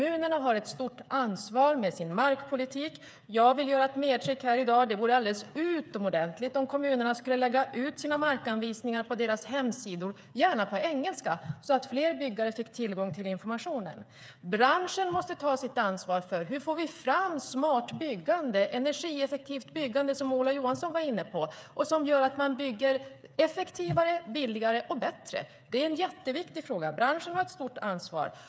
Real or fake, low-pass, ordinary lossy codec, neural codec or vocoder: fake; none; none; codec, 16 kHz, 8 kbps, FreqCodec, smaller model